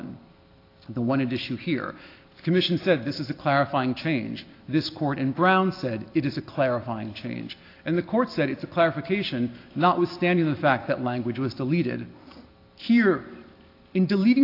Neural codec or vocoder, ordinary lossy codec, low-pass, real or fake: none; AAC, 32 kbps; 5.4 kHz; real